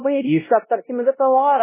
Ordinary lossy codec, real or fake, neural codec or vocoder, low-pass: MP3, 16 kbps; fake; codec, 16 kHz, 0.5 kbps, X-Codec, WavLM features, trained on Multilingual LibriSpeech; 3.6 kHz